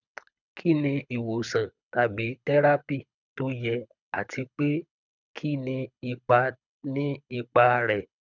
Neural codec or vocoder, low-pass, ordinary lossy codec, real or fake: codec, 24 kHz, 6 kbps, HILCodec; 7.2 kHz; none; fake